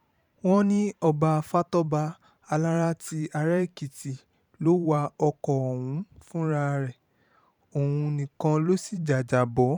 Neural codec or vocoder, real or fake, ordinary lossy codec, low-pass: vocoder, 44.1 kHz, 128 mel bands every 512 samples, BigVGAN v2; fake; none; 19.8 kHz